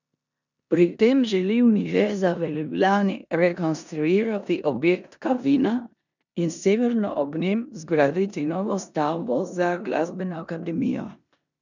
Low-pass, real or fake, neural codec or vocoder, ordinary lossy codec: 7.2 kHz; fake; codec, 16 kHz in and 24 kHz out, 0.9 kbps, LongCat-Audio-Codec, four codebook decoder; none